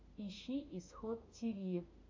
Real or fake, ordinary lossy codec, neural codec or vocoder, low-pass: fake; MP3, 64 kbps; autoencoder, 48 kHz, 32 numbers a frame, DAC-VAE, trained on Japanese speech; 7.2 kHz